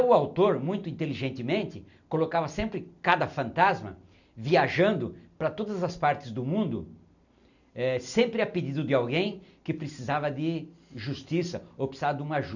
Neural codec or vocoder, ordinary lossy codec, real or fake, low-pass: none; none; real; 7.2 kHz